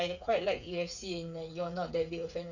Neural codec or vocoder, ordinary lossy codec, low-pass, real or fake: codec, 16 kHz, 8 kbps, FreqCodec, smaller model; none; 7.2 kHz; fake